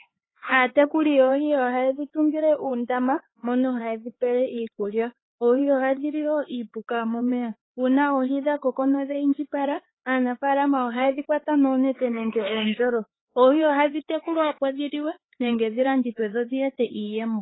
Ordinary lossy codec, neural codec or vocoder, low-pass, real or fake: AAC, 16 kbps; codec, 16 kHz, 4 kbps, X-Codec, HuBERT features, trained on LibriSpeech; 7.2 kHz; fake